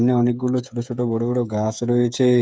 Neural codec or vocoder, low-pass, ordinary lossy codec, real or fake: codec, 16 kHz, 8 kbps, FreqCodec, smaller model; none; none; fake